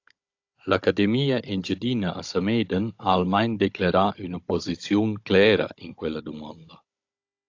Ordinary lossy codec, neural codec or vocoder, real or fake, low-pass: AAC, 48 kbps; codec, 16 kHz, 16 kbps, FunCodec, trained on Chinese and English, 50 frames a second; fake; 7.2 kHz